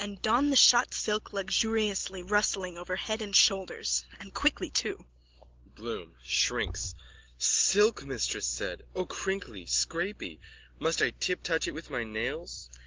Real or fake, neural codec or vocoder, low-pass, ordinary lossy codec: real; none; 7.2 kHz; Opus, 16 kbps